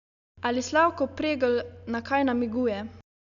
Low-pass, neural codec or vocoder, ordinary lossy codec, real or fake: 7.2 kHz; none; none; real